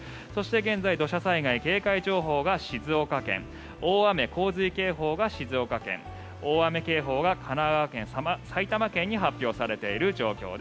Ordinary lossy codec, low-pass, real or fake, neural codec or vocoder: none; none; real; none